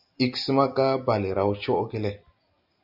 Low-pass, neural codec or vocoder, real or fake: 5.4 kHz; none; real